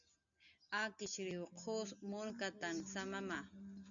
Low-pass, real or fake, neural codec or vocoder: 7.2 kHz; real; none